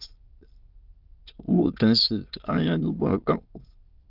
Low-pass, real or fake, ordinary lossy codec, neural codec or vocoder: 5.4 kHz; fake; Opus, 24 kbps; autoencoder, 22.05 kHz, a latent of 192 numbers a frame, VITS, trained on many speakers